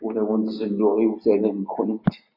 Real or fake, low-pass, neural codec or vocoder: real; 5.4 kHz; none